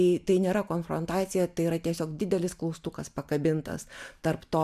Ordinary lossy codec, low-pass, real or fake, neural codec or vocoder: MP3, 96 kbps; 14.4 kHz; real; none